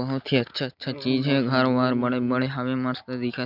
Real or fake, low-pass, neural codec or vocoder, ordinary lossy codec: real; 5.4 kHz; none; none